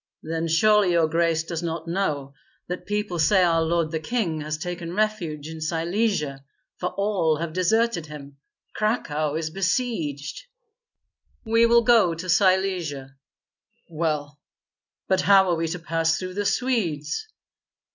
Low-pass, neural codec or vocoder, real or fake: 7.2 kHz; none; real